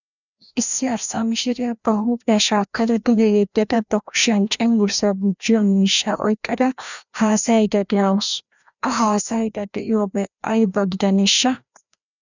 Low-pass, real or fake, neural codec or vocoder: 7.2 kHz; fake; codec, 16 kHz, 1 kbps, FreqCodec, larger model